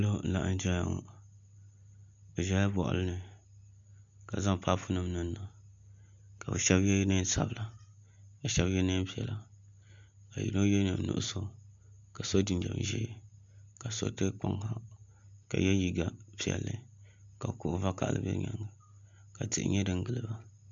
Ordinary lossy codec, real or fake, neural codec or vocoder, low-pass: MP3, 64 kbps; real; none; 7.2 kHz